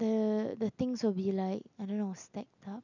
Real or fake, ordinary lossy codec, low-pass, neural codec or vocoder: real; none; 7.2 kHz; none